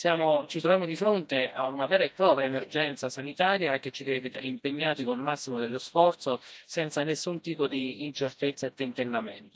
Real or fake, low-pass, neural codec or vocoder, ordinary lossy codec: fake; none; codec, 16 kHz, 1 kbps, FreqCodec, smaller model; none